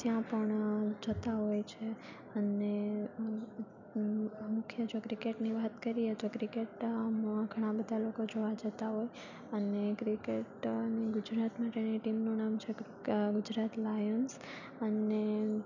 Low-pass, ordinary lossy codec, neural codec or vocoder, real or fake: 7.2 kHz; none; none; real